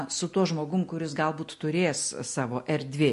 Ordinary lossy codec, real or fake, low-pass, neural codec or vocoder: MP3, 48 kbps; real; 14.4 kHz; none